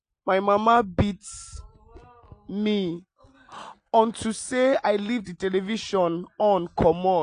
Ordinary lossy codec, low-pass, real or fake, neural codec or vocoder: AAC, 48 kbps; 9.9 kHz; real; none